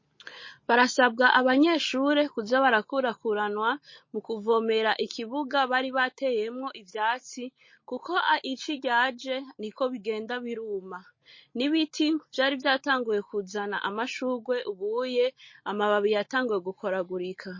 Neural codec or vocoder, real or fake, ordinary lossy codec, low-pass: none; real; MP3, 32 kbps; 7.2 kHz